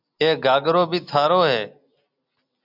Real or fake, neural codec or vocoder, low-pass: real; none; 5.4 kHz